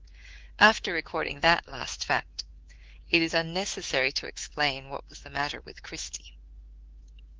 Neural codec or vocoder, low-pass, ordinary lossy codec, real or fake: codec, 24 kHz, 3.1 kbps, DualCodec; 7.2 kHz; Opus, 16 kbps; fake